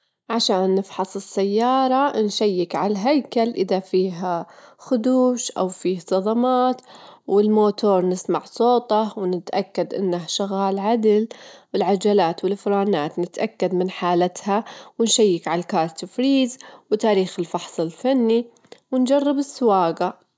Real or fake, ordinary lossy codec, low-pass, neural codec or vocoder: real; none; none; none